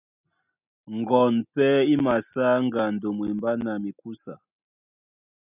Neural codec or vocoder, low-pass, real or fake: none; 3.6 kHz; real